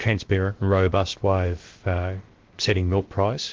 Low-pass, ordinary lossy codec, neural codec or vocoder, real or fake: 7.2 kHz; Opus, 16 kbps; codec, 16 kHz, about 1 kbps, DyCAST, with the encoder's durations; fake